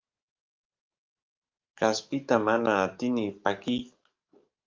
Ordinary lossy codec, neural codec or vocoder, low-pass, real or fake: Opus, 24 kbps; codec, 44.1 kHz, 7.8 kbps, DAC; 7.2 kHz; fake